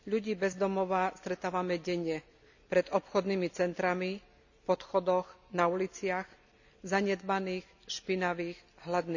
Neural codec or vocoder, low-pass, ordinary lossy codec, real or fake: none; 7.2 kHz; none; real